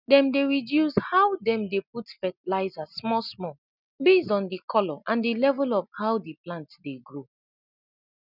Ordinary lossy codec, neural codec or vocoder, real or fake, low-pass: none; none; real; 5.4 kHz